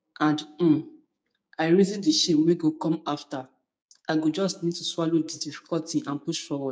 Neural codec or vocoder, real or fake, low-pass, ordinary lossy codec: codec, 16 kHz, 6 kbps, DAC; fake; none; none